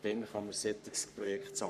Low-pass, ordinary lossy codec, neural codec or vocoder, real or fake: 14.4 kHz; none; codec, 44.1 kHz, 3.4 kbps, Pupu-Codec; fake